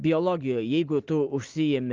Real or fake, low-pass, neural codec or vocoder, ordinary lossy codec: real; 7.2 kHz; none; Opus, 32 kbps